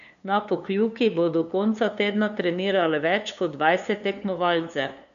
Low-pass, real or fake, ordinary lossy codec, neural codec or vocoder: 7.2 kHz; fake; none; codec, 16 kHz, 2 kbps, FunCodec, trained on LibriTTS, 25 frames a second